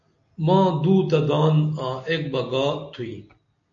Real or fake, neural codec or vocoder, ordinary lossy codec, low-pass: real; none; AAC, 64 kbps; 7.2 kHz